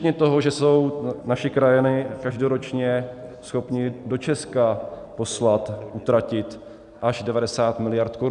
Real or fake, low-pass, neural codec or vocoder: real; 10.8 kHz; none